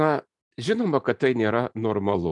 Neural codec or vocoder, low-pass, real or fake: none; 10.8 kHz; real